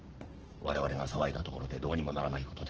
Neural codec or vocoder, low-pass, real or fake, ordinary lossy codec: codec, 44.1 kHz, 7.8 kbps, Pupu-Codec; 7.2 kHz; fake; Opus, 16 kbps